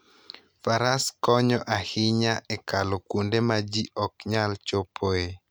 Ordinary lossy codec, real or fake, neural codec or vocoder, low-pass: none; real; none; none